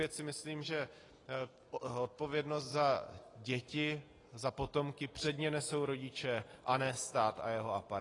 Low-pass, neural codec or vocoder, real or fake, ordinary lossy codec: 10.8 kHz; none; real; AAC, 32 kbps